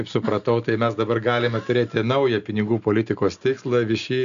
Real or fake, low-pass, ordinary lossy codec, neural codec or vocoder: real; 7.2 kHz; MP3, 96 kbps; none